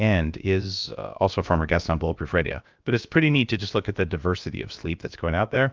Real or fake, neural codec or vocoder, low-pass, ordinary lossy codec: fake; codec, 16 kHz, about 1 kbps, DyCAST, with the encoder's durations; 7.2 kHz; Opus, 24 kbps